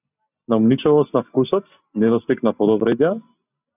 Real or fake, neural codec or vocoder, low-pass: real; none; 3.6 kHz